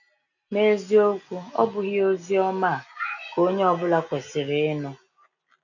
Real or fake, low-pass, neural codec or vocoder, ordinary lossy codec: real; 7.2 kHz; none; none